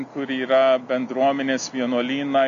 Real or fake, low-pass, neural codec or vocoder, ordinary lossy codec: real; 7.2 kHz; none; AAC, 96 kbps